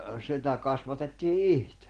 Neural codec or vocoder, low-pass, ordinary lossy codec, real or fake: none; 9.9 kHz; Opus, 16 kbps; real